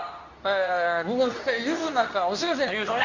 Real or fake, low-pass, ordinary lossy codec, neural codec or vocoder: fake; 7.2 kHz; none; codec, 24 kHz, 0.9 kbps, WavTokenizer, medium speech release version 2